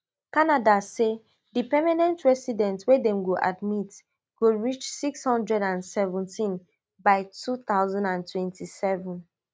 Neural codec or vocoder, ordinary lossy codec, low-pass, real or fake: none; none; none; real